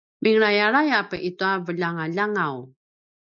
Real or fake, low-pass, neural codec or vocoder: real; 7.2 kHz; none